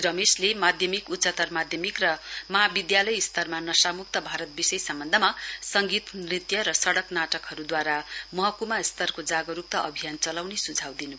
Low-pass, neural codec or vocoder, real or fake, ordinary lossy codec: none; none; real; none